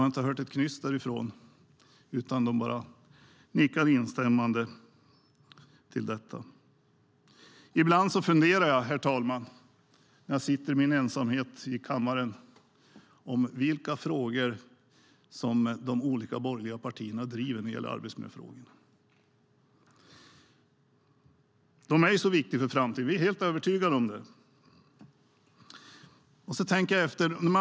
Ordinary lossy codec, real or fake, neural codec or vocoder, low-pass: none; real; none; none